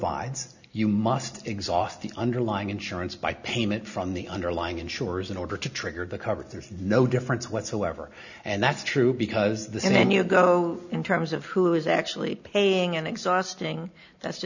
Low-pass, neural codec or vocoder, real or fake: 7.2 kHz; none; real